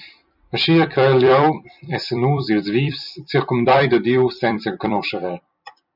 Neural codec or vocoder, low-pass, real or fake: vocoder, 44.1 kHz, 128 mel bands every 256 samples, BigVGAN v2; 5.4 kHz; fake